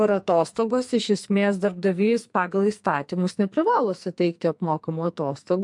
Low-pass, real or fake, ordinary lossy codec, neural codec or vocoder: 10.8 kHz; fake; MP3, 64 kbps; codec, 44.1 kHz, 2.6 kbps, SNAC